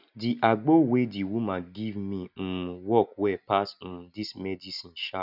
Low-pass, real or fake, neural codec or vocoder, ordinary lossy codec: 5.4 kHz; real; none; none